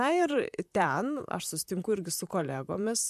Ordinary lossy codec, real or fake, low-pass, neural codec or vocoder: MP3, 96 kbps; fake; 14.4 kHz; vocoder, 44.1 kHz, 128 mel bands, Pupu-Vocoder